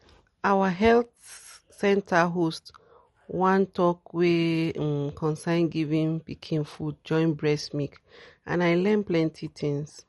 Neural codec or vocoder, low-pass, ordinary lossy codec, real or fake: none; 19.8 kHz; MP3, 48 kbps; real